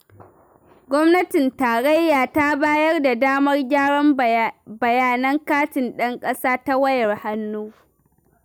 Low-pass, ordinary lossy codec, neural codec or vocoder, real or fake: none; none; none; real